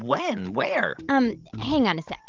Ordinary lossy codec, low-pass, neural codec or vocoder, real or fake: Opus, 32 kbps; 7.2 kHz; codec, 16 kHz, 16 kbps, FreqCodec, larger model; fake